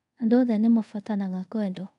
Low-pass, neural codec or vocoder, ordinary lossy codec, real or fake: 10.8 kHz; codec, 24 kHz, 0.5 kbps, DualCodec; none; fake